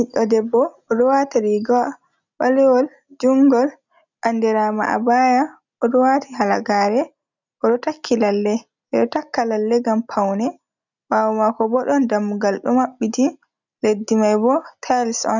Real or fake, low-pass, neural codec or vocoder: real; 7.2 kHz; none